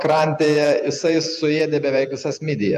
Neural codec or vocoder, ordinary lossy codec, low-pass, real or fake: vocoder, 48 kHz, 128 mel bands, Vocos; AAC, 96 kbps; 14.4 kHz; fake